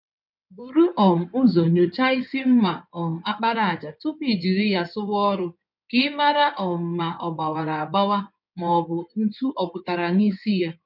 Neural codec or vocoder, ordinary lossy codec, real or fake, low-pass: codec, 16 kHz in and 24 kHz out, 2.2 kbps, FireRedTTS-2 codec; none; fake; 5.4 kHz